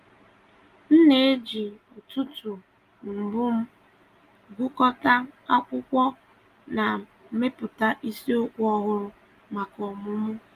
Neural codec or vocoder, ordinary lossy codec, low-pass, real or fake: none; Opus, 32 kbps; 14.4 kHz; real